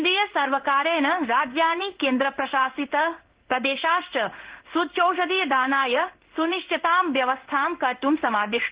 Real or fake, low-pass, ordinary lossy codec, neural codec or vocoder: fake; 3.6 kHz; Opus, 16 kbps; codec, 16 kHz in and 24 kHz out, 1 kbps, XY-Tokenizer